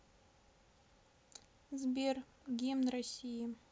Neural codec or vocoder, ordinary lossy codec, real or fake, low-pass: none; none; real; none